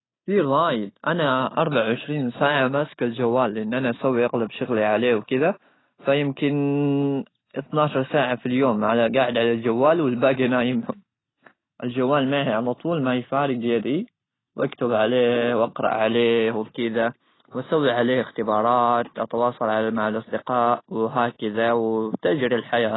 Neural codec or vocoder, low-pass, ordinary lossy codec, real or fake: none; 7.2 kHz; AAC, 16 kbps; real